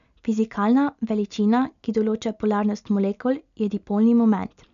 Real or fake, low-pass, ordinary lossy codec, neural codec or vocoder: real; 7.2 kHz; none; none